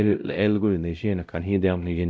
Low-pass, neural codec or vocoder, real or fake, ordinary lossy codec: none; codec, 16 kHz, 0.5 kbps, X-Codec, WavLM features, trained on Multilingual LibriSpeech; fake; none